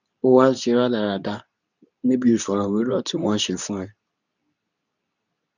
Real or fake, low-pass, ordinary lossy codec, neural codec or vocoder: fake; 7.2 kHz; none; codec, 24 kHz, 0.9 kbps, WavTokenizer, medium speech release version 2